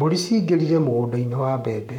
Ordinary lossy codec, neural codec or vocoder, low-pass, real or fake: none; codec, 44.1 kHz, 7.8 kbps, Pupu-Codec; 19.8 kHz; fake